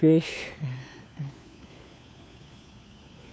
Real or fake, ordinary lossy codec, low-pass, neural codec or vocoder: fake; none; none; codec, 16 kHz, 4 kbps, FunCodec, trained on LibriTTS, 50 frames a second